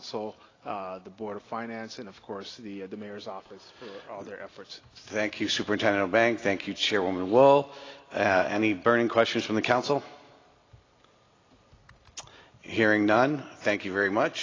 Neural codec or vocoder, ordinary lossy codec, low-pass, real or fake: none; AAC, 32 kbps; 7.2 kHz; real